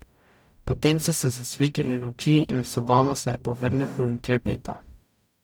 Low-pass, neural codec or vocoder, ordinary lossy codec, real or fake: none; codec, 44.1 kHz, 0.9 kbps, DAC; none; fake